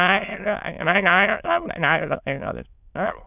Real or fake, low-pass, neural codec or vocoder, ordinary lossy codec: fake; 3.6 kHz; autoencoder, 22.05 kHz, a latent of 192 numbers a frame, VITS, trained on many speakers; none